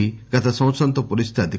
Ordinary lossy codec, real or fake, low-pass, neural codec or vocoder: none; real; none; none